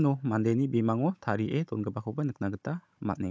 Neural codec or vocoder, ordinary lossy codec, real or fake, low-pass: codec, 16 kHz, 16 kbps, FunCodec, trained on Chinese and English, 50 frames a second; none; fake; none